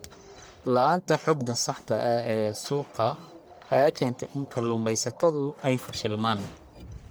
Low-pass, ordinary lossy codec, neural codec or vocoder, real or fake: none; none; codec, 44.1 kHz, 1.7 kbps, Pupu-Codec; fake